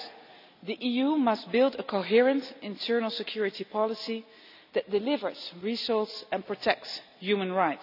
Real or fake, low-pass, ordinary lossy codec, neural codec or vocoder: real; 5.4 kHz; none; none